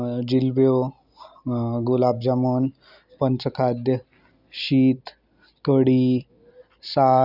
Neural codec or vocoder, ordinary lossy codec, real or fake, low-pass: none; none; real; 5.4 kHz